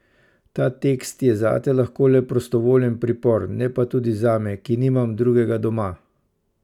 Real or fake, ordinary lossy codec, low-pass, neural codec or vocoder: real; none; 19.8 kHz; none